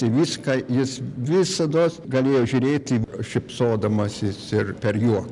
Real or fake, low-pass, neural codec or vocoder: real; 10.8 kHz; none